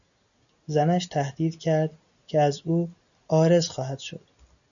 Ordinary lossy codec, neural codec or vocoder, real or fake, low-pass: MP3, 96 kbps; none; real; 7.2 kHz